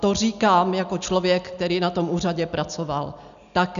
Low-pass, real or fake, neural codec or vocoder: 7.2 kHz; real; none